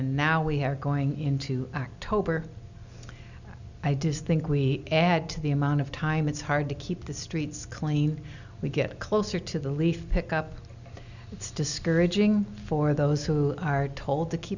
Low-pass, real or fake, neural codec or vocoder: 7.2 kHz; real; none